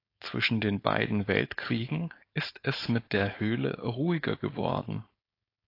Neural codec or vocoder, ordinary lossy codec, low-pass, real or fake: codec, 16 kHz, 4.8 kbps, FACodec; AAC, 32 kbps; 5.4 kHz; fake